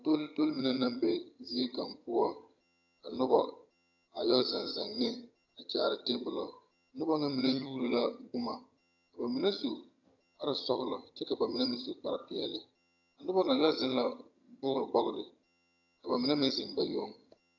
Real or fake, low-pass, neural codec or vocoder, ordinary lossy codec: fake; 7.2 kHz; vocoder, 22.05 kHz, 80 mel bands, HiFi-GAN; AAC, 48 kbps